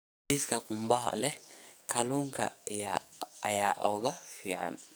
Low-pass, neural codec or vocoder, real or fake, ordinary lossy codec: none; codec, 44.1 kHz, 2.6 kbps, SNAC; fake; none